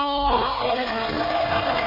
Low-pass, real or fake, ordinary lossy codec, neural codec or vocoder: 5.4 kHz; fake; MP3, 24 kbps; codec, 24 kHz, 1 kbps, SNAC